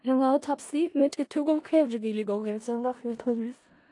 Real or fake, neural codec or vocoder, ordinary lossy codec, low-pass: fake; codec, 16 kHz in and 24 kHz out, 0.4 kbps, LongCat-Audio-Codec, four codebook decoder; AAC, 48 kbps; 10.8 kHz